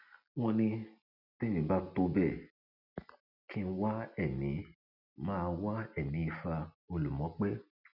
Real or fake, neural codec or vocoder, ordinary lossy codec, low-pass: real; none; none; 5.4 kHz